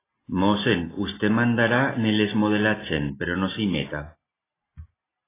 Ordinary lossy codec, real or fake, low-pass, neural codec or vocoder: AAC, 16 kbps; real; 3.6 kHz; none